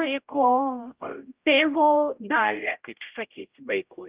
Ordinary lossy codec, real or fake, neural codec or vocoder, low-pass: Opus, 32 kbps; fake; codec, 16 kHz, 0.5 kbps, X-Codec, HuBERT features, trained on general audio; 3.6 kHz